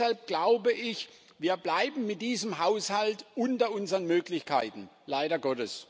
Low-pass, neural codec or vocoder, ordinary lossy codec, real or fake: none; none; none; real